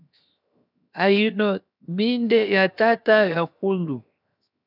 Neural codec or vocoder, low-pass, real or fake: codec, 16 kHz, 0.7 kbps, FocalCodec; 5.4 kHz; fake